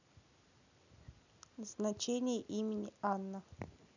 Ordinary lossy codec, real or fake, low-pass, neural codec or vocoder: none; real; 7.2 kHz; none